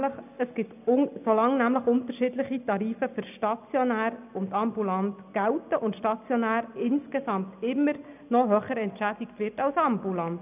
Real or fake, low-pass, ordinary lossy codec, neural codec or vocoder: real; 3.6 kHz; none; none